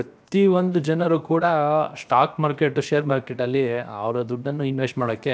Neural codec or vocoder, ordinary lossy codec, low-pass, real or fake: codec, 16 kHz, 0.7 kbps, FocalCodec; none; none; fake